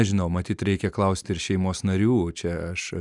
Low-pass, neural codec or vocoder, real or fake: 10.8 kHz; none; real